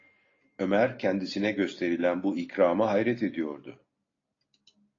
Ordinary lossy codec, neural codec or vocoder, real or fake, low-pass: AAC, 32 kbps; none; real; 7.2 kHz